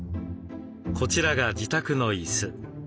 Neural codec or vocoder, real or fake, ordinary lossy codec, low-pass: none; real; none; none